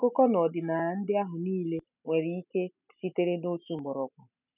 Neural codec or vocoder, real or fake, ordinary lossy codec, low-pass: none; real; none; 3.6 kHz